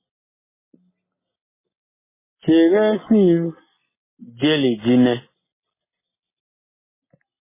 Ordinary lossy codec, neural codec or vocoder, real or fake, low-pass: MP3, 16 kbps; none; real; 3.6 kHz